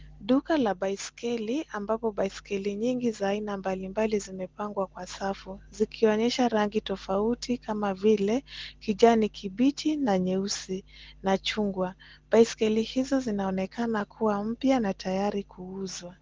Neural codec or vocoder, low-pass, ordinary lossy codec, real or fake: none; 7.2 kHz; Opus, 16 kbps; real